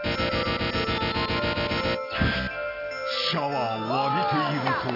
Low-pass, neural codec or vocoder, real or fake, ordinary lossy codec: 5.4 kHz; none; real; AAC, 24 kbps